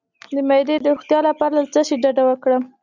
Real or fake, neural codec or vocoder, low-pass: real; none; 7.2 kHz